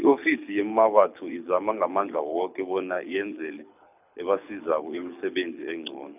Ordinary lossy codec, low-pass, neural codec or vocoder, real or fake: none; 3.6 kHz; codec, 24 kHz, 6 kbps, HILCodec; fake